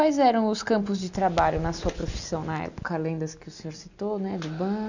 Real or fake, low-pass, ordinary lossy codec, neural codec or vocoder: real; 7.2 kHz; none; none